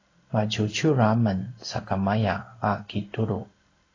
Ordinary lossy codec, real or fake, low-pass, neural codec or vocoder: AAC, 32 kbps; fake; 7.2 kHz; codec, 16 kHz in and 24 kHz out, 1 kbps, XY-Tokenizer